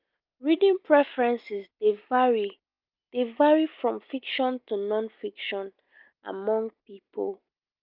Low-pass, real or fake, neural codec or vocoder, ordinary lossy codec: 5.4 kHz; real; none; Opus, 24 kbps